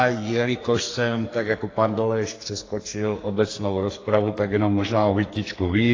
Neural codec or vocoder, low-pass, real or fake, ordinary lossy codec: codec, 44.1 kHz, 2.6 kbps, SNAC; 7.2 kHz; fake; AAC, 32 kbps